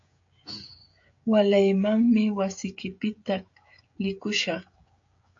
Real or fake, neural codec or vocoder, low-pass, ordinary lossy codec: fake; codec, 16 kHz, 16 kbps, FreqCodec, smaller model; 7.2 kHz; AAC, 48 kbps